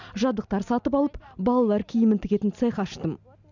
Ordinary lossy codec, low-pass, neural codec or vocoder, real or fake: none; 7.2 kHz; none; real